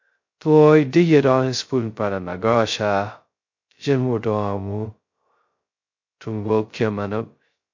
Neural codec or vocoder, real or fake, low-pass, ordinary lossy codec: codec, 16 kHz, 0.2 kbps, FocalCodec; fake; 7.2 kHz; MP3, 48 kbps